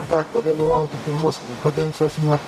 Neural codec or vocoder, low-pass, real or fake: codec, 44.1 kHz, 0.9 kbps, DAC; 14.4 kHz; fake